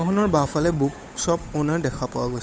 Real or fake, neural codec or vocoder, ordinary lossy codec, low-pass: fake; codec, 16 kHz, 8 kbps, FunCodec, trained on Chinese and English, 25 frames a second; none; none